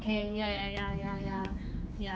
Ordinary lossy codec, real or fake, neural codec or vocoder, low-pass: none; fake; codec, 16 kHz, 4 kbps, X-Codec, HuBERT features, trained on general audio; none